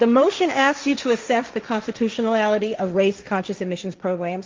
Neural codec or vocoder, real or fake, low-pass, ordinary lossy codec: codec, 16 kHz, 1.1 kbps, Voila-Tokenizer; fake; 7.2 kHz; Opus, 32 kbps